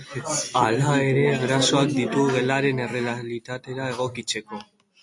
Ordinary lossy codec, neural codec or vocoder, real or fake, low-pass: MP3, 48 kbps; none; real; 10.8 kHz